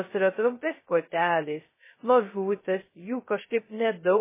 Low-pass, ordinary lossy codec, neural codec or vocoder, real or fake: 3.6 kHz; MP3, 16 kbps; codec, 16 kHz, 0.2 kbps, FocalCodec; fake